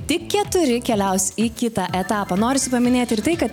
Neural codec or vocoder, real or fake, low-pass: none; real; 19.8 kHz